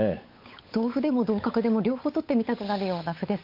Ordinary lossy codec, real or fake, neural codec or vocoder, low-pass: MP3, 32 kbps; fake; codec, 16 kHz, 8 kbps, FunCodec, trained on Chinese and English, 25 frames a second; 5.4 kHz